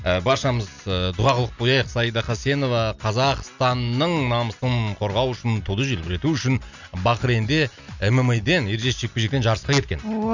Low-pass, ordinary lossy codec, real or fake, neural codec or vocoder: 7.2 kHz; none; real; none